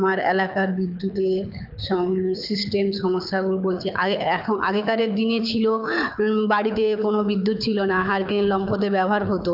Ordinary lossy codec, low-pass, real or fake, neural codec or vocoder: none; 5.4 kHz; fake; codec, 24 kHz, 6 kbps, HILCodec